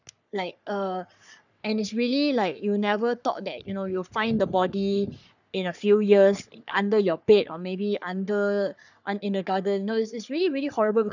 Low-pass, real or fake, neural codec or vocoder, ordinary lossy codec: 7.2 kHz; fake; codec, 44.1 kHz, 3.4 kbps, Pupu-Codec; none